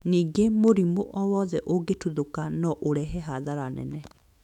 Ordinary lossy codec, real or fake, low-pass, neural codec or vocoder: none; fake; 19.8 kHz; autoencoder, 48 kHz, 128 numbers a frame, DAC-VAE, trained on Japanese speech